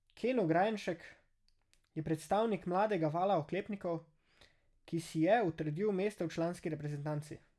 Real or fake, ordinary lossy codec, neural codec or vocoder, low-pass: real; none; none; none